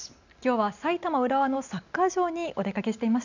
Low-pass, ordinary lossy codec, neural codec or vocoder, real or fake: 7.2 kHz; none; none; real